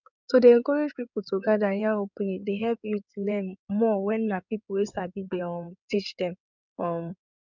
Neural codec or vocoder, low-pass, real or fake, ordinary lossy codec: codec, 16 kHz in and 24 kHz out, 2.2 kbps, FireRedTTS-2 codec; 7.2 kHz; fake; none